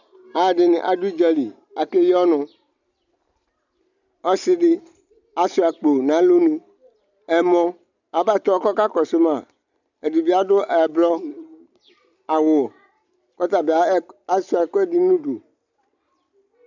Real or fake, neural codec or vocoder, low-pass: real; none; 7.2 kHz